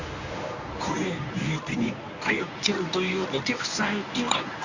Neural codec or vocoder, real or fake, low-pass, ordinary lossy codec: codec, 24 kHz, 0.9 kbps, WavTokenizer, medium speech release version 1; fake; 7.2 kHz; none